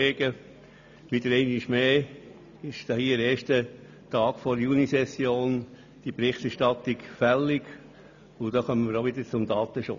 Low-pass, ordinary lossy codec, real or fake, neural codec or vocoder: 7.2 kHz; none; real; none